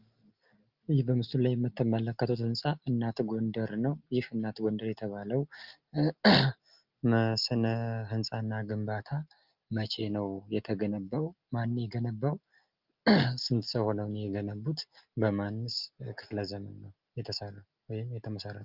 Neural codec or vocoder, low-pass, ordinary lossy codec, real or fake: none; 5.4 kHz; Opus, 24 kbps; real